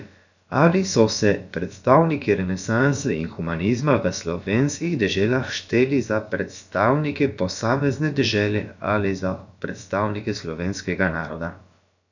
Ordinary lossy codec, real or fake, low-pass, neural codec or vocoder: none; fake; 7.2 kHz; codec, 16 kHz, about 1 kbps, DyCAST, with the encoder's durations